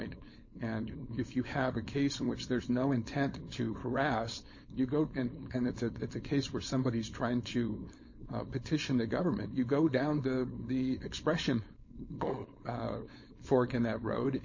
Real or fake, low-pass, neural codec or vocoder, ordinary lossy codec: fake; 7.2 kHz; codec, 16 kHz, 4.8 kbps, FACodec; MP3, 32 kbps